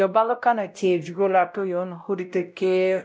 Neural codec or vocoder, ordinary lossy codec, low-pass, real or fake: codec, 16 kHz, 0.5 kbps, X-Codec, WavLM features, trained on Multilingual LibriSpeech; none; none; fake